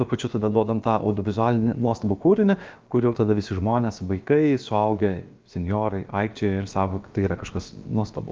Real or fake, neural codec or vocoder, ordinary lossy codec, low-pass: fake; codec, 16 kHz, about 1 kbps, DyCAST, with the encoder's durations; Opus, 24 kbps; 7.2 kHz